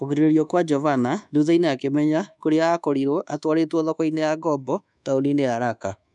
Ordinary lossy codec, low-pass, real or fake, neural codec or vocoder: none; 10.8 kHz; fake; codec, 24 kHz, 1.2 kbps, DualCodec